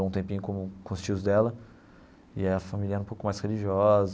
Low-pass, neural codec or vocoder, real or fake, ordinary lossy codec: none; none; real; none